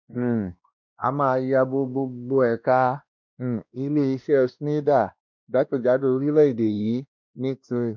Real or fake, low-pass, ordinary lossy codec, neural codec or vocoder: fake; 7.2 kHz; MP3, 64 kbps; codec, 16 kHz, 1 kbps, X-Codec, WavLM features, trained on Multilingual LibriSpeech